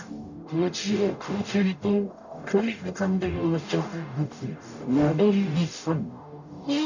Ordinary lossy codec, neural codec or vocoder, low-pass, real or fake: none; codec, 44.1 kHz, 0.9 kbps, DAC; 7.2 kHz; fake